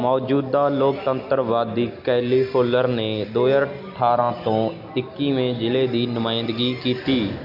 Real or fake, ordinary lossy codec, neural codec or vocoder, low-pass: real; none; none; 5.4 kHz